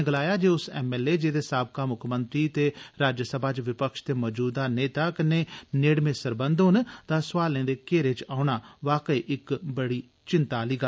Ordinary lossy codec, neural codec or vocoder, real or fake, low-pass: none; none; real; none